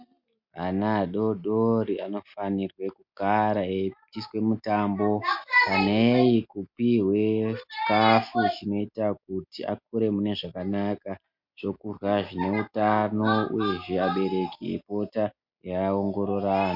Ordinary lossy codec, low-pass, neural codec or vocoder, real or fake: MP3, 48 kbps; 5.4 kHz; none; real